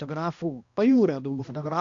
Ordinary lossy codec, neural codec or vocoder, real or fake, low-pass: Opus, 64 kbps; codec, 16 kHz, 1 kbps, X-Codec, HuBERT features, trained on balanced general audio; fake; 7.2 kHz